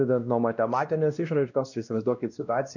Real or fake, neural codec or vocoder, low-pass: fake; codec, 16 kHz, 1 kbps, X-Codec, WavLM features, trained on Multilingual LibriSpeech; 7.2 kHz